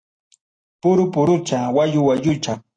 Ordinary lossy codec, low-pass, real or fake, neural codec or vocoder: AAC, 32 kbps; 9.9 kHz; real; none